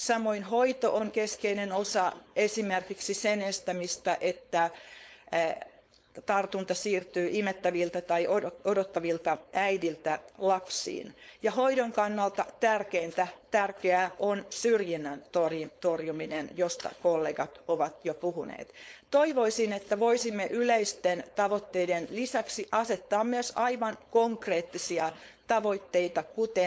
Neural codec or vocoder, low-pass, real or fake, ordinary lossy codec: codec, 16 kHz, 4.8 kbps, FACodec; none; fake; none